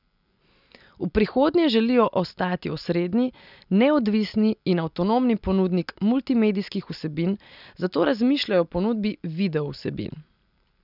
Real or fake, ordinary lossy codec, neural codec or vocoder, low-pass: real; none; none; 5.4 kHz